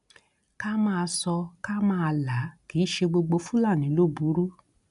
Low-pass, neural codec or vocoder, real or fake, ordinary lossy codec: 10.8 kHz; none; real; MP3, 96 kbps